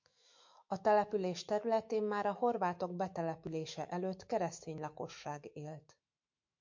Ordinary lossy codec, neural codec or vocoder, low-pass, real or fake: MP3, 48 kbps; autoencoder, 48 kHz, 128 numbers a frame, DAC-VAE, trained on Japanese speech; 7.2 kHz; fake